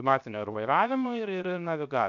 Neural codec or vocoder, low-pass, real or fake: codec, 16 kHz, 0.7 kbps, FocalCodec; 7.2 kHz; fake